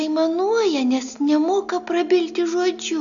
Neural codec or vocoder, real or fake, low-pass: none; real; 7.2 kHz